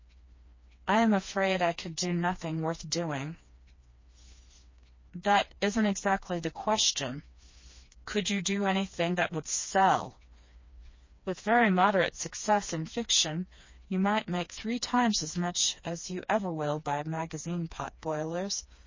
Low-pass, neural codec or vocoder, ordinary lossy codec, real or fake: 7.2 kHz; codec, 16 kHz, 2 kbps, FreqCodec, smaller model; MP3, 32 kbps; fake